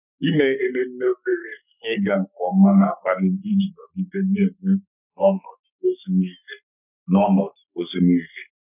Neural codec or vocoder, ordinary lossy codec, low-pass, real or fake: codec, 44.1 kHz, 3.4 kbps, Pupu-Codec; none; 3.6 kHz; fake